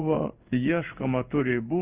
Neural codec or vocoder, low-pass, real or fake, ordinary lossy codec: codec, 16 kHz in and 24 kHz out, 1 kbps, XY-Tokenizer; 3.6 kHz; fake; Opus, 32 kbps